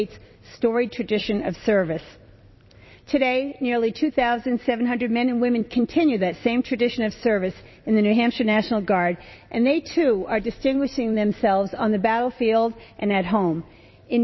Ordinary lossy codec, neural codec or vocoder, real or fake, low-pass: MP3, 24 kbps; vocoder, 44.1 kHz, 128 mel bands every 256 samples, BigVGAN v2; fake; 7.2 kHz